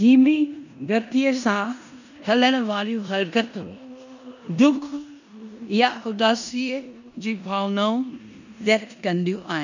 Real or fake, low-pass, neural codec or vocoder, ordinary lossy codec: fake; 7.2 kHz; codec, 16 kHz in and 24 kHz out, 0.9 kbps, LongCat-Audio-Codec, four codebook decoder; none